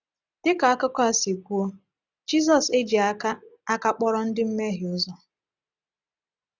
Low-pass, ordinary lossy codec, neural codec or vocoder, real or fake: 7.2 kHz; none; none; real